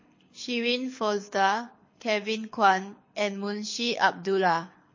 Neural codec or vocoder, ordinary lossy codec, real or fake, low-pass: codec, 24 kHz, 6 kbps, HILCodec; MP3, 32 kbps; fake; 7.2 kHz